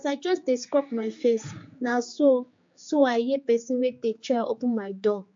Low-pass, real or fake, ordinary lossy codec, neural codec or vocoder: 7.2 kHz; fake; MP3, 48 kbps; codec, 16 kHz, 4 kbps, X-Codec, HuBERT features, trained on general audio